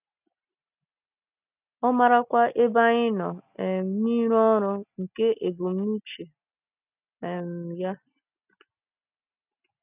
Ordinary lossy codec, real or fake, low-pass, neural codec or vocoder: none; real; 3.6 kHz; none